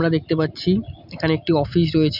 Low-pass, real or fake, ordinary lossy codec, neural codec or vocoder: 5.4 kHz; real; none; none